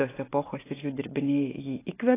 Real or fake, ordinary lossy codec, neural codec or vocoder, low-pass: real; AAC, 16 kbps; none; 3.6 kHz